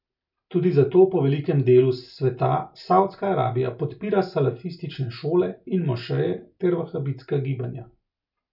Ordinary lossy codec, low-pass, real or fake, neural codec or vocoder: none; 5.4 kHz; real; none